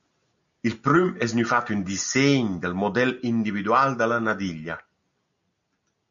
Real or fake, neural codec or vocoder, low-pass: real; none; 7.2 kHz